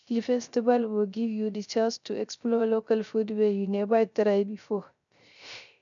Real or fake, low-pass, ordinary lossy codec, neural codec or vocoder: fake; 7.2 kHz; MP3, 96 kbps; codec, 16 kHz, 0.3 kbps, FocalCodec